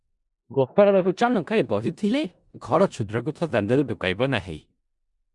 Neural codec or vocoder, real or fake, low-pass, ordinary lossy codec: codec, 16 kHz in and 24 kHz out, 0.4 kbps, LongCat-Audio-Codec, four codebook decoder; fake; 10.8 kHz; Opus, 64 kbps